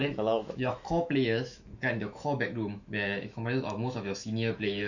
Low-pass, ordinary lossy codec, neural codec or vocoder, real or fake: 7.2 kHz; none; autoencoder, 48 kHz, 128 numbers a frame, DAC-VAE, trained on Japanese speech; fake